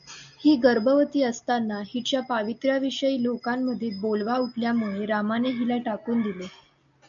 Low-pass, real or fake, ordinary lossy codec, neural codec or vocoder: 7.2 kHz; real; MP3, 64 kbps; none